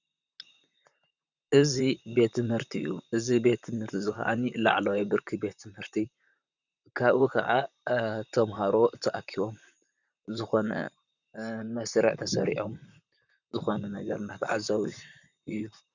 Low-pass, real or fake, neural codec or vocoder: 7.2 kHz; fake; vocoder, 44.1 kHz, 128 mel bands, Pupu-Vocoder